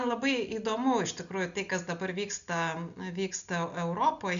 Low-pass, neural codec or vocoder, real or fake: 7.2 kHz; none; real